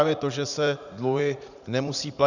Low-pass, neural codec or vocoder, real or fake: 7.2 kHz; vocoder, 44.1 kHz, 80 mel bands, Vocos; fake